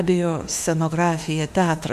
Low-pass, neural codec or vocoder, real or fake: 14.4 kHz; autoencoder, 48 kHz, 32 numbers a frame, DAC-VAE, trained on Japanese speech; fake